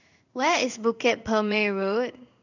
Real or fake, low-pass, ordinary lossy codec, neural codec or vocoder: fake; 7.2 kHz; none; codec, 16 kHz in and 24 kHz out, 1 kbps, XY-Tokenizer